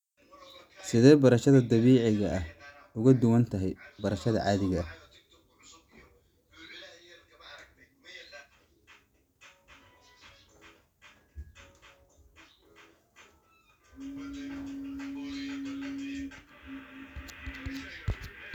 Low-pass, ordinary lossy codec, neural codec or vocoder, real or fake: 19.8 kHz; none; none; real